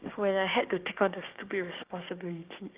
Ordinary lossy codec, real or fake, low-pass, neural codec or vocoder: Opus, 24 kbps; fake; 3.6 kHz; codec, 16 kHz, 6 kbps, DAC